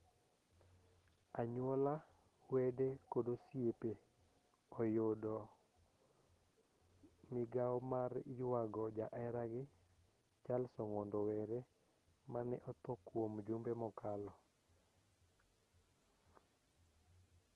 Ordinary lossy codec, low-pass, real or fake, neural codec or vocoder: Opus, 16 kbps; 10.8 kHz; real; none